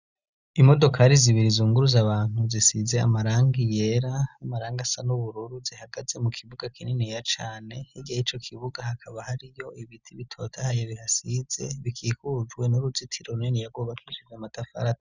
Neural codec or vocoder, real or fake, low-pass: none; real; 7.2 kHz